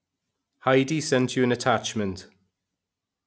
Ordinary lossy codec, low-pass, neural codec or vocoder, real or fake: none; none; none; real